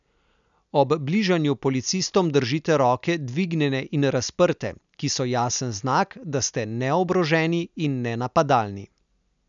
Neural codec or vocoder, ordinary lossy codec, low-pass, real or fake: none; none; 7.2 kHz; real